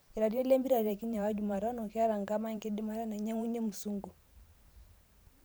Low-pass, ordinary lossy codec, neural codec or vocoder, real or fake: none; none; vocoder, 44.1 kHz, 128 mel bands every 512 samples, BigVGAN v2; fake